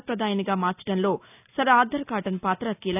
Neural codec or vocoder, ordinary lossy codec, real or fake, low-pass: none; none; real; 3.6 kHz